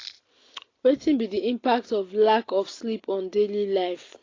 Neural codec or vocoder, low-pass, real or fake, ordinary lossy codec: none; 7.2 kHz; real; AAC, 32 kbps